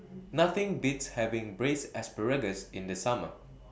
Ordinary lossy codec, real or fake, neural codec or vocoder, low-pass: none; real; none; none